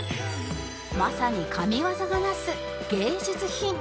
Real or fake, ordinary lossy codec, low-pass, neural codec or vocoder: real; none; none; none